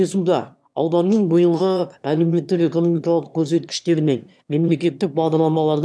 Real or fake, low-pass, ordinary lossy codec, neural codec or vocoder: fake; none; none; autoencoder, 22.05 kHz, a latent of 192 numbers a frame, VITS, trained on one speaker